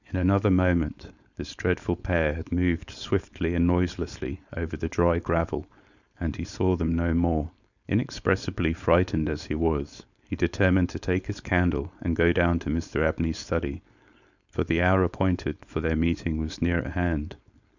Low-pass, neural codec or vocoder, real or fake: 7.2 kHz; codec, 16 kHz, 4.8 kbps, FACodec; fake